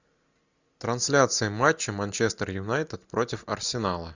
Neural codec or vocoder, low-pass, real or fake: none; 7.2 kHz; real